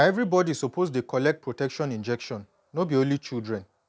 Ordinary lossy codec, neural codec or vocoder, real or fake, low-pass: none; none; real; none